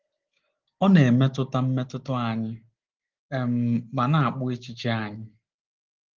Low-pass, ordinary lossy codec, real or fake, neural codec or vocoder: 7.2 kHz; Opus, 16 kbps; real; none